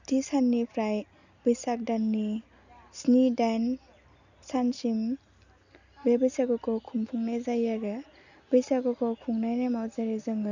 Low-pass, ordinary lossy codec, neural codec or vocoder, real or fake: 7.2 kHz; none; none; real